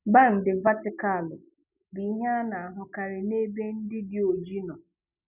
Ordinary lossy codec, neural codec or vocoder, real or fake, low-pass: none; none; real; 3.6 kHz